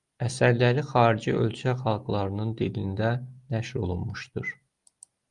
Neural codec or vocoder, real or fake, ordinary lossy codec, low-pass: none; real; Opus, 32 kbps; 10.8 kHz